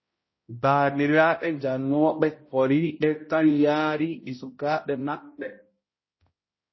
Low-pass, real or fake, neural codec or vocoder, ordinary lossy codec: 7.2 kHz; fake; codec, 16 kHz, 0.5 kbps, X-Codec, HuBERT features, trained on balanced general audio; MP3, 24 kbps